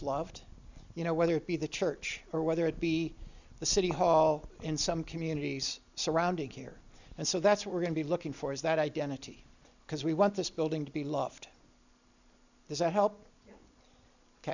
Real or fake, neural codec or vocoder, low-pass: real; none; 7.2 kHz